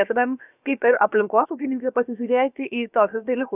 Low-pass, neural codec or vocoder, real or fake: 3.6 kHz; codec, 16 kHz, about 1 kbps, DyCAST, with the encoder's durations; fake